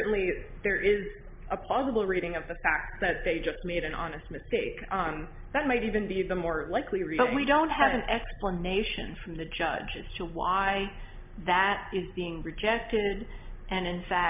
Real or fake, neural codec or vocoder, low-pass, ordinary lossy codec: real; none; 3.6 kHz; MP3, 32 kbps